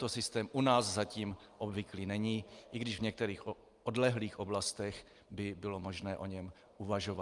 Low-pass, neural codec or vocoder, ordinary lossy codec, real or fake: 10.8 kHz; none; Opus, 32 kbps; real